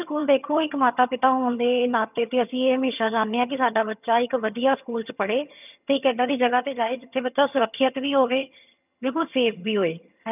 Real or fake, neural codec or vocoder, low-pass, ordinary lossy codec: fake; vocoder, 22.05 kHz, 80 mel bands, HiFi-GAN; 3.6 kHz; none